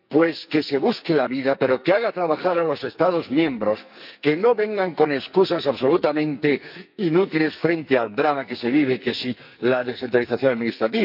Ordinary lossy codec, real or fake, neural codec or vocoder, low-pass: none; fake; codec, 44.1 kHz, 2.6 kbps, SNAC; 5.4 kHz